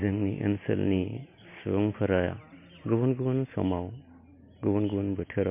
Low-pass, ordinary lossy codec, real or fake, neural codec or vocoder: 3.6 kHz; MP3, 24 kbps; real; none